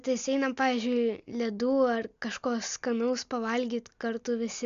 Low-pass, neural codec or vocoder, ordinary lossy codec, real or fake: 7.2 kHz; none; MP3, 64 kbps; real